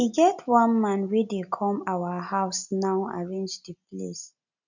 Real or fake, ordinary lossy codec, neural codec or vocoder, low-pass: real; none; none; 7.2 kHz